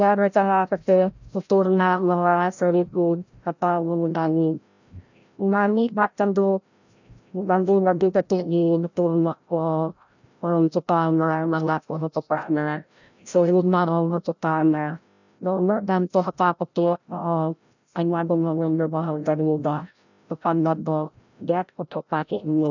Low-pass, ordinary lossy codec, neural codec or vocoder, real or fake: 7.2 kHz; none; codec, 16 kHz, 0.5 kbps, FreqCodec, larger model; fake